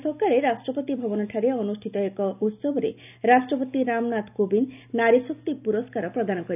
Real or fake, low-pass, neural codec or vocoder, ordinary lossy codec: real; 3.6 kHz; none; none